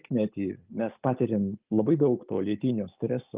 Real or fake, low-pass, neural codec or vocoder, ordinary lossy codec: real; 3.6 kHz; none; Opus, 32 kbps